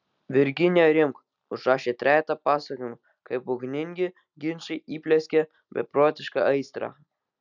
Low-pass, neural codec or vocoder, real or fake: 7.2 kHz; none; real